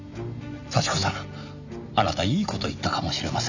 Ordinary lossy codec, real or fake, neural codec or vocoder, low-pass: none; real; none; 7.2 kHz